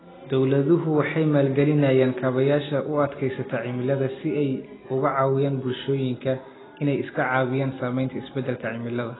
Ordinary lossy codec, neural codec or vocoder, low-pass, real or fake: AAC, 16 kbps; none; 7.2 kHz; real